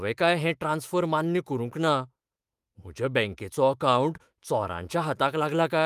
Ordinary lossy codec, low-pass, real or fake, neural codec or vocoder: Opus, 32 kbps; 14.4 kHz; fake; autoencoder, 48 kHz, 128 numbers a frame, DAC-VAE, trained on Japanese speech